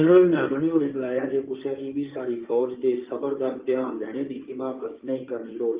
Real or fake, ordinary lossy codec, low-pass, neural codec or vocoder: fake; Opus, 32 kbps; 3.6 kHz; codec, 16 kHz in and 24 kHz out, 2.2 kbps, FireRedTTS-2 codec